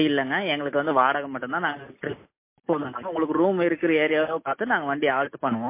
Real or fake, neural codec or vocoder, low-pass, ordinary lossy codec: real; none; 3.6 kHz; MP3, 24 kbps